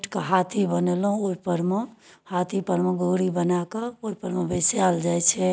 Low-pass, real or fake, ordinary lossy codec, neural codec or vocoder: none; real; none; none